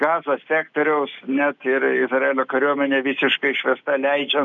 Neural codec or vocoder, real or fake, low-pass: none; real; 7.2 kHz